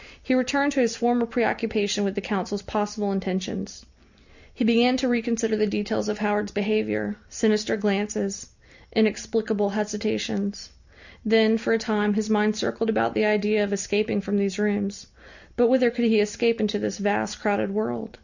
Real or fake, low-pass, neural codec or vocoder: real; 7.2 kHz; none